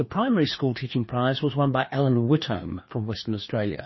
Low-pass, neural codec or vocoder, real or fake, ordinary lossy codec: 7.2 kHz; codec, 16 kHz, 2 kbps, FreqCodec, larger model; fake; MP3, 24 kbps